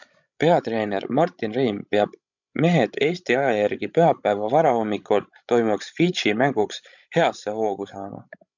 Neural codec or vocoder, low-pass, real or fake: codec, 16 kHz, 16 kbps, FreqCodec, larger model; 7.2 kHz; fake